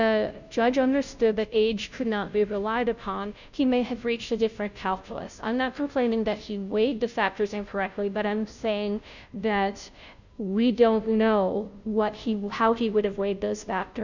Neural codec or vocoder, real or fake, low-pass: codec, 16 kHz, 0.5 kbps, FunCodec, trained on Chinese and English, 25 frames a second; fake; 7.2 kHz